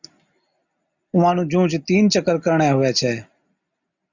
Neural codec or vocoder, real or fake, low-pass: none; real; 7.2 kHz